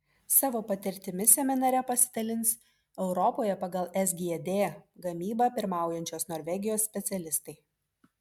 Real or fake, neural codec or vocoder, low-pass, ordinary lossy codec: real; none; 19.8 kHz; MP3, 96 kbps